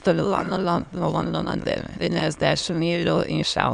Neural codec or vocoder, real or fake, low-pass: autoencoder, 22.05 kHz, a latent of 192 numbers a frame, VITS, trained on many speakers; fake; 9.9 kHz